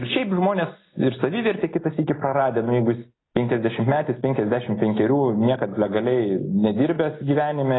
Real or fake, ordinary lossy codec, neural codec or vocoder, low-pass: real; AAC, 16 kbps; none; 7.2 kHz